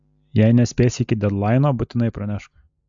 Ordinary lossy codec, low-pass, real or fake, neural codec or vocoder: MP3, 64 kbps; 7.2 kHz; real; none